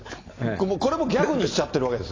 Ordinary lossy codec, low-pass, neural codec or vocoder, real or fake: AAC, 32 kbps; 7.2 kHz; none; real